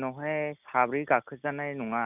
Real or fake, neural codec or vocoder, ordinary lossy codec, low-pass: real; none; none; 3.6 kHz